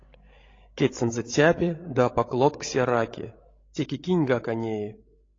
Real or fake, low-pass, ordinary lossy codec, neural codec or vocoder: fake; 7.2 kHz; AAC, 32 kbps; codec, 16 kHz, 16 kbps, FreqCodec, larger model